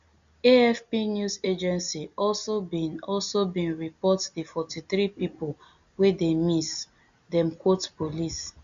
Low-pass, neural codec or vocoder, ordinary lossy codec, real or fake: 7.2 kHz; none; Opus, 64 kbps; real